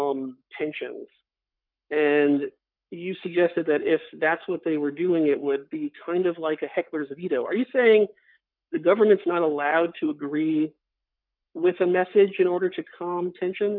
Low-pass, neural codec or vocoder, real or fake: 5.4 kHz; codec, 44.1 kHz, 7.8 kbps, Pupu-Codec; fake